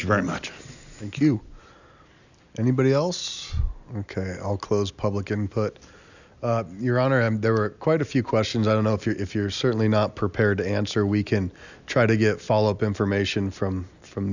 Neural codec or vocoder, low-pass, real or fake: none; 7.2 kHz; real